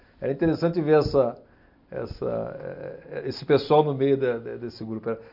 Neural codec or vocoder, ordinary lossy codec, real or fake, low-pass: none; none; real; 5.4 kHz